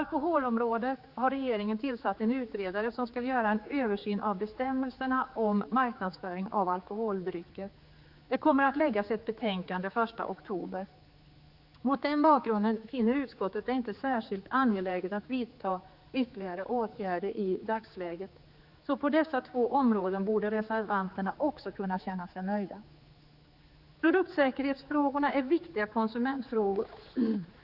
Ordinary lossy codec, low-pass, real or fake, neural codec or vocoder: Opus, 64 kbps; 5.4 kHz; fake; codec, 16 kHz, 4 kbps, X-Codec, HuBERT features, trained on general audio